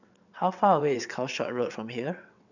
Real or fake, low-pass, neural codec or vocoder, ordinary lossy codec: fake; 7.2 kHz; vocoder, 22.05 kHz, 80 mel bands, WaveNeXt; none